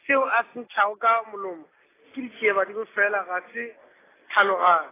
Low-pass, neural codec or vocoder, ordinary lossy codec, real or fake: 3.6 kHz; none; AAC, 16 kbps; real